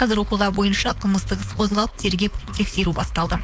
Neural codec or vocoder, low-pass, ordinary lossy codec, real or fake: codec, 16 kHz, 4.8 kbps, FACodec; none; none; fake